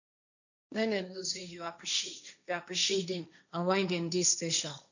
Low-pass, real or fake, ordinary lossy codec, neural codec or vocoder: 7.2 kHz; fake; none; codec, 16 kHz, 1.1 kbps, Voila-Tokenizer